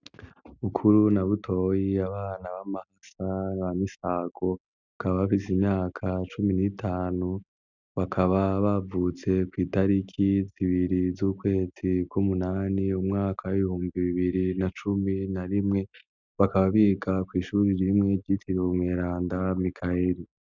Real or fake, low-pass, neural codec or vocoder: real; 7.2 kHz; none